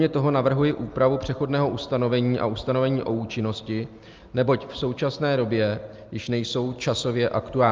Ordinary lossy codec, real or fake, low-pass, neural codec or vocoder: Opus, 24 kbps; real; 7.2 kHz; none